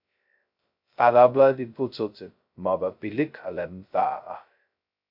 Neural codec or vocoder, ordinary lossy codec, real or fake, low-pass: codec, 16 kHz, 0.2 kbps, FocalCodec; AAC, 48 kbps; fake; 5.4 kHz